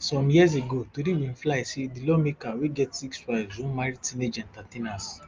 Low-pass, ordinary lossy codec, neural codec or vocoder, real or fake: 7.2 kHz; Opus, 32 kbps; none; real